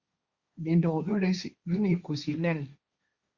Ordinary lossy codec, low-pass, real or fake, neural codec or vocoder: Opus, 64 kbps; 7.2 kHz; fake; codec, 16 kHz, 1.1 kbps, Voila-Tokenizer